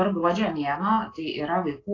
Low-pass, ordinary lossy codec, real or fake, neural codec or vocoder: 7.2 kHz; AAC, 32 kbps; real; none